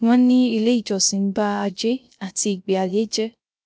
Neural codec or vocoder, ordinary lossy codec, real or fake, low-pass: codec, 16 kHz, 0.3 kbps, FocalCodec; none; fake; none